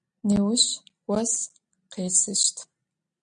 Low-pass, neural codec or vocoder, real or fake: 9.9 kHz; none; real